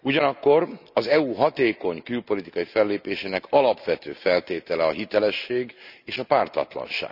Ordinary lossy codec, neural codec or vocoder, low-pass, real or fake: none; none; 5.4 kHz; real